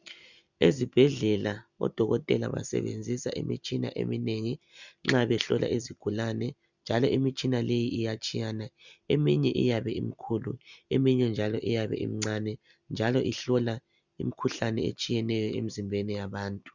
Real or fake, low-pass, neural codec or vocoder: real; 7.2 kHz; none